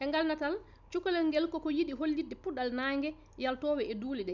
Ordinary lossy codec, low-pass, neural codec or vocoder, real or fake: none; 7.2 kHz; none; real